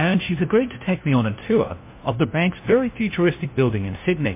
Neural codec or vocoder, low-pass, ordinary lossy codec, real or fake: codec, 16 kHz, 1 kbps, FunCodec, trained on LibriTTS, 50 frames a second; 3.6 kHz; MP3, 24 kbps; fake